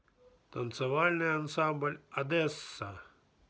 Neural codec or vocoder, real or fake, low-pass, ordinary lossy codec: none; real; none; none